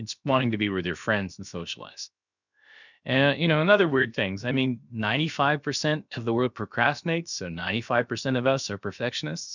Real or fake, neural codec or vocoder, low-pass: fake; codec, 16 kHz, about 1 kbps, DyCAST, with the encoder's durations; 7.2 kHz